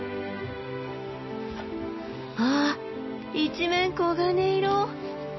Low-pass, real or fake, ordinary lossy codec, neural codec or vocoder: 7.2 kHz; real; MP3, 24 kbps; none